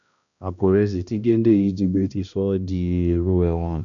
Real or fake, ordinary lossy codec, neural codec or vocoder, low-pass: fake; none; codec, 16 kHz, 1 kbps, X-Codec, HuBERT features, trained on balanced general audio; 7.2 kHz